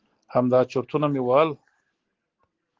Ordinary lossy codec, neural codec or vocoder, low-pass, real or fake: Opus, 16 kbps; none; 7.2 kHz; real